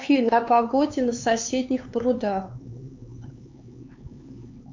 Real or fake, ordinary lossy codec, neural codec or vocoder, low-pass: fake; MP3, 48 kbps; codec, 16 kHz, 4 kbps, X-Codec, HuBERT features, trained on LibriSpeech; 7.2 kHz